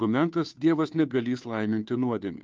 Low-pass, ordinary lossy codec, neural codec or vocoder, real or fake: 7.2 kHz; Opus, 32 kbps; codec, 16 kHz, 2 kbps, FunCodec, trained on Chinese and English, 25 frames a second; fake